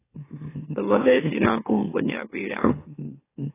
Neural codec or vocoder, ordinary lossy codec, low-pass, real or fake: autoencoder, 44.1 kHz, a latent of 192 numbers a frame, MeloTTS; AAC, 16 kbps; 3.6 kHz; fake